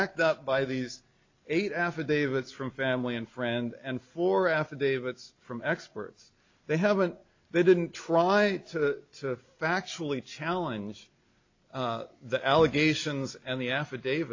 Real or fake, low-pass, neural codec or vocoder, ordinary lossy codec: real; 7.2 kHz; none; AAC, 48 kbps